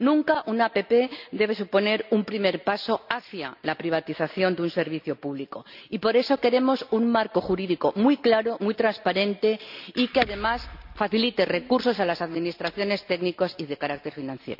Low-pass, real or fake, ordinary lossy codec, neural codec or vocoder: 5.4 kHz; real; none; none